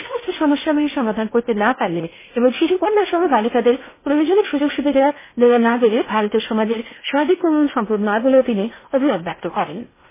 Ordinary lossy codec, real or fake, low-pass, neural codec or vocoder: MP3, 16 kbps; fake; 3.6 kHz; codec, 16 kHz in and 24 kHz out, 0.8 kbps, FocalCodec, streaming, 65536 codes